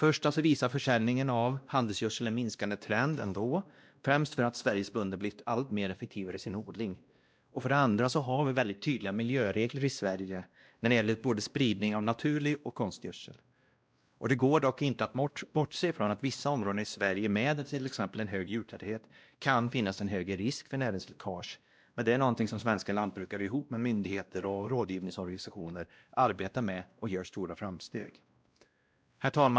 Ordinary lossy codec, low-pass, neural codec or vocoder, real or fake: none; none; codec, 16 kHz, 1 kbps, X-Codec, WavLM features, trained on Multilingual LibriSpeech; fake